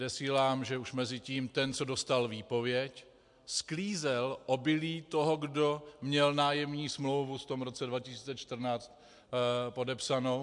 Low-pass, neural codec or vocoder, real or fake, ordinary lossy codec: 10.8 kHz; none; real; MP3, 64 kbps